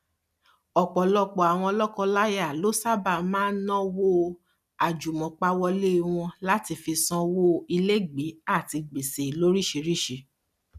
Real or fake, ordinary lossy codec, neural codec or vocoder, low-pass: real; none; none; 14.4 kHz